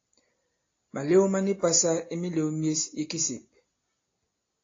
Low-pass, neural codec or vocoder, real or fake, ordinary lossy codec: 7.2 kHz; none; real; AAC, 32 kbps